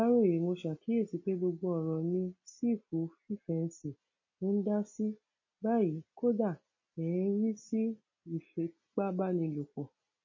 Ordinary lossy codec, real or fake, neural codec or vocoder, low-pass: MP3, 32 kbps; real; none; 7.2 kHz